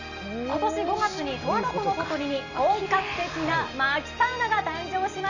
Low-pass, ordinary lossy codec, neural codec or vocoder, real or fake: 7.2 kHz; none; none; real